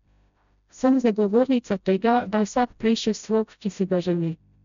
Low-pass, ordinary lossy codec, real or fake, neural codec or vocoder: 7.2 kHz; MP3, 96 kbps; fake; codec, 16 kHz, 0.5 kbps, FreqCodec, smaller model